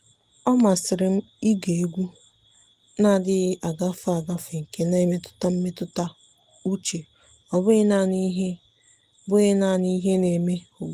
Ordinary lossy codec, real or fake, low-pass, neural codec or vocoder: Opus, 24 kbps; real; 14.4 kHz; none